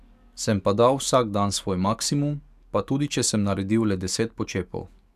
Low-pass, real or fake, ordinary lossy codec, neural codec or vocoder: 14.4 kHz; fake; none; codec, 44.1 kHz, 7.8 kbps, DAC